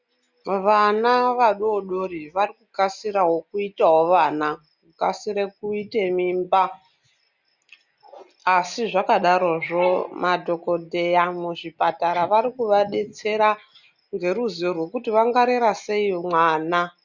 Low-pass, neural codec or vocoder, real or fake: 7.2 kHz; none; real